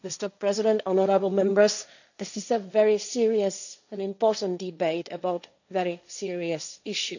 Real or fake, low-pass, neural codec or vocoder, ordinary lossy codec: fake; 7.2 kHz; codec, 16 kHz, 1.1 kbps, Voila-Tokenizer; none